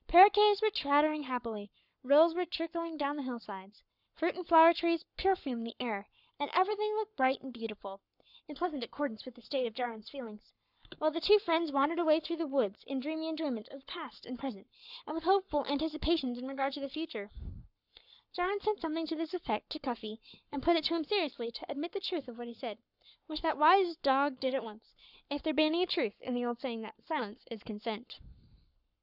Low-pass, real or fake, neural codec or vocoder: 5.4 kHz; fake; codec, 44.1 kHz, 7.8 kbps, Pupu-Codec